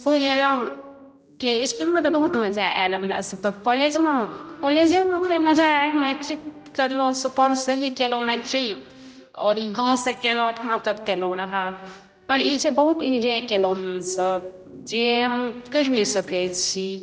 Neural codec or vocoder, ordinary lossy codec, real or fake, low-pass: codec, 16 kHz, 0.5 kbps, X-Codec, HuBERT features, trained on general audio; none; fake; none